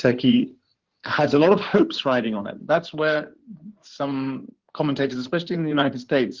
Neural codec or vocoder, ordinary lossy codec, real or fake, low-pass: codec, 16 kHz in and 24 kHz out, 2.2 kbps, FireRedTTS-2 codec; Opus, 16 kbps; fake; 7.2 kHz